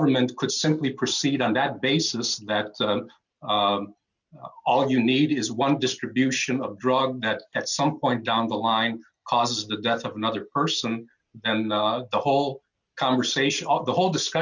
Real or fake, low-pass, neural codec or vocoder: real; 7.2 kHz; none